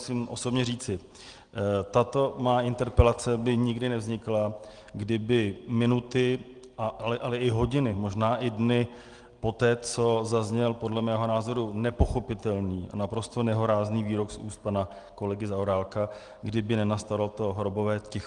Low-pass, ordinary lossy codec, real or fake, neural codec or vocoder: 9.9 kHz; Opus, 24 kbps; real; none